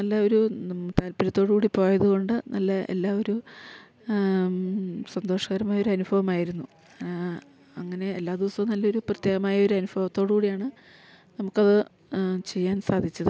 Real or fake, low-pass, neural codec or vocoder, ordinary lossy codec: real; none; none; none